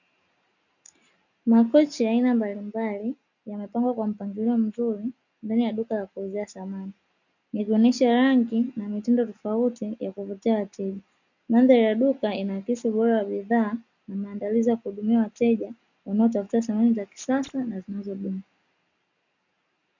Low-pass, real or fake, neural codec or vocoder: 7.2 kHz; real; none